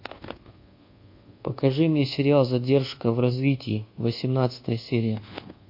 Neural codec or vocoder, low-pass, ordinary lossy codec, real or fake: codec, 24 kHz, 1.2 kbps, DualCodec; 5.4 kHz; MP3, 32 kbps; fake